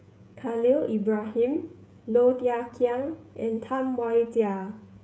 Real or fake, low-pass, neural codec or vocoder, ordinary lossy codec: fake; none; codec, 16 kHz, 16 kbps, FreqCodec, smaller model; none